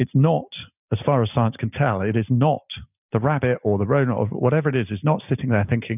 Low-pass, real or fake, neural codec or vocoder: 3.6 kHz; real; none